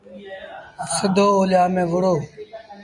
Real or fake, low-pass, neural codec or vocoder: real; 10.8 kHz; none